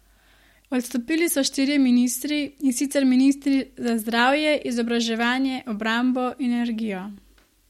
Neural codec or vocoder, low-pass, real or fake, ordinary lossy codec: none; 19.8 kHz; real; MP3, 64 kbps